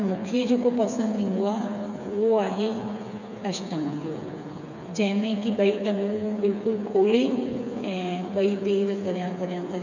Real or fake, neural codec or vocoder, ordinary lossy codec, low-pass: fake; codec, 16 kHz, 4 kbps, FreqCodec, smaller model; none; 7.2 kHz